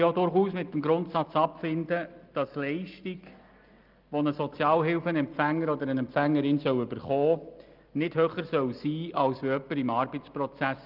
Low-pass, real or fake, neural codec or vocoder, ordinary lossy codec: 5.4 kHz; real; none; Opus, 32 kbps